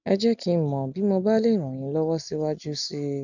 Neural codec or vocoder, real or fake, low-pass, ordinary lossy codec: none; real; 7.2 kHz; none